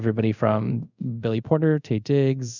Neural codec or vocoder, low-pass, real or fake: codec, 24 kHz, 0.5 kbps, DualCodec; 7.2 kHz; fake